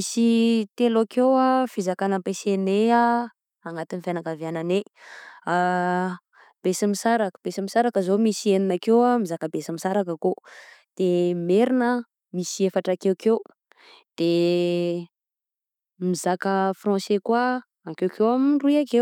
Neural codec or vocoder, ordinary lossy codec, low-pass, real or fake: none; none; 19.8 kHz; real